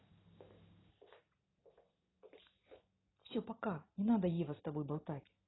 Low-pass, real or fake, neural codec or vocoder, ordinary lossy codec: 7.2 kHz; real; none; AAC, 16 kbps